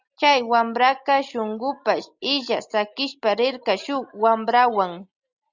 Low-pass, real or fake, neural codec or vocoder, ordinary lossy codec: 7.2 kHz; real; none; Opus, 64 kbps